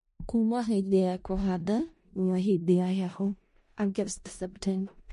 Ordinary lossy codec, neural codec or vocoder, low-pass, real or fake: MP3, 48 kbps; codec, 16 kHz in and 24 kHz out, 0.4 kbps, LongCat-Audio-Codec, four codebook decoder; 10.8 kHz; fake